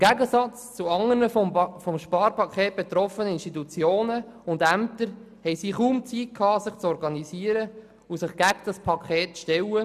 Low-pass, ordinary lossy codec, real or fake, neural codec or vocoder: 14.4 kHz; none; real; none